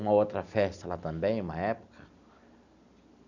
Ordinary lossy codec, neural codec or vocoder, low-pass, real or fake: none; none; 7.2 kHz; real